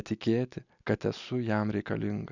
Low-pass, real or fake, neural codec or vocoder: 7.2 kHz; real; none